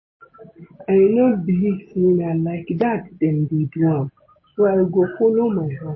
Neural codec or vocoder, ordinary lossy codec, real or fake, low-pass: none; MP3, 24 kbps; real; 7.2 kHz